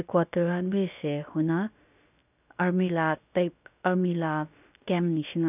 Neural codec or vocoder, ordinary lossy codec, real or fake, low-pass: codec, 16 kHz, about 1 kbps, DyCAST, with the encoder's durations; none; fake; 3.6 kHz